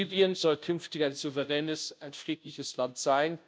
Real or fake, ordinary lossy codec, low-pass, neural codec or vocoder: fake; none; none; codec, 16 kHz, 0.5 kbps, FunCodec, trained on Chinese and English, 25 frames a second